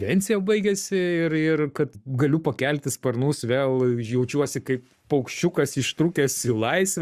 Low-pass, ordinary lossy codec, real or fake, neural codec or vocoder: 14.4 kHz; Opus, 64 kbps; fake; codec, 44.1 kHz, 7.8 kbps, Pupu-Codec